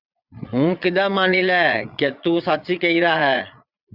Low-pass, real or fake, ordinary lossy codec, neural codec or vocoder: 5.4 kHz; fake; Opus, 64 kbps; vocoder, 22.05 kHz, 80 mel bands, Vocos